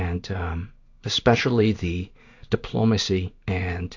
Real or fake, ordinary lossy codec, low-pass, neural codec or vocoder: fake; AAC, 48 kbps; 7.2 kHz; codec, 16 kHz in and 24 kHz out, 1 kbps, XY-Tokenizer